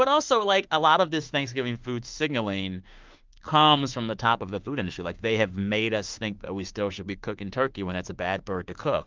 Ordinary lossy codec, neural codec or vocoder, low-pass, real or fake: Opus, 32 kbps; autoencoder, 48 kHz, 32 numbers a frame, DAC-VAE, trained on Japanese speech; 7.2 kHz; fake